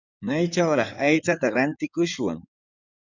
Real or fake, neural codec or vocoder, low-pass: fake; codec, 16 kHz in and 24 kHz out, 2.2 kbps, FireRedTTS-2 codec; 7.2 kHz